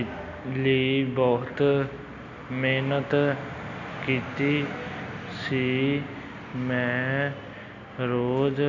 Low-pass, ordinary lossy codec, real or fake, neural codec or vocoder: 7.2 kHz; none; real; none